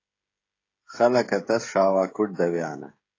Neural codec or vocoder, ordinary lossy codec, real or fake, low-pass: codec, 16 kHz, 16 kbps, FreqCodec, smaller model; AAC, 32 kbps; fake; 7.2 kHz